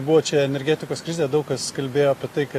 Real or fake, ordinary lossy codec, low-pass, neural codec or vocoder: real; AAC, 48 kbps; 14.4 kHz; none